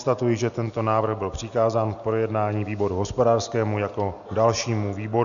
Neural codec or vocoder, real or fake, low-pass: none; real; 7.2 kHz